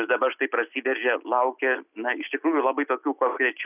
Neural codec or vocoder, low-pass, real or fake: none; 3.6 kHz; real